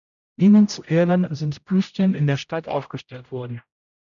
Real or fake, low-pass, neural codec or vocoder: fake; 7.2 kHz; codec, 16 kHz, 0.5 kbps, X-Codec, HuBERT features, trained on general audio